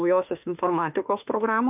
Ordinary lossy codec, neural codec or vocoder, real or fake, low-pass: AAC, 32 kbps; autoencoder, 48 kHz, 32 numbers a frame, DAC-VAE, trained on Japanese speech; fake; 3.6 kHz